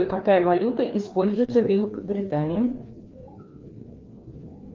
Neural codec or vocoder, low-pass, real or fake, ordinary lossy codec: codec, 16 kHz, 2 kbps, FreqCodec, larger model; 7.2 kHz; fake; Opus, 32 kbps